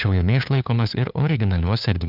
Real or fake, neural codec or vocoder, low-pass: fake; codec, 16 kHz, 2 kbps, FunCodec, trained on LibriTTS, 25 frames a second; 5.4 kHz